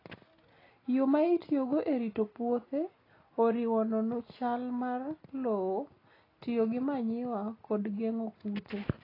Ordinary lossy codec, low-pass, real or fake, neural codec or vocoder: AAC, 24 kbps; 5.4 kHz; real; none